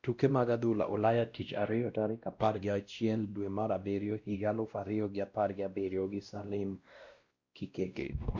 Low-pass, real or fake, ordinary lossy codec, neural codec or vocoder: 7.2 kHz; fake; Opus, 64 kbps; codec, 16 kHz, 1 kbps, X-Codec, WavLM features, trained on Multilingual LibriSpeech